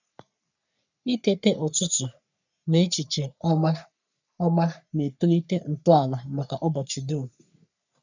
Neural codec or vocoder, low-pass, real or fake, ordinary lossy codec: codec, 44.1 kHz, 3.4 kbps, Pupu-Codec; 7.2 kHz; fake; none